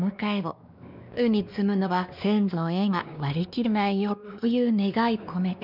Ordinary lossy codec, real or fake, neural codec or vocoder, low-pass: none; fake; codec, 16 kHz, 2 kbps, X-Codec, WavLM features, trained on Multilingual LibriSpeech; 5.4 kHz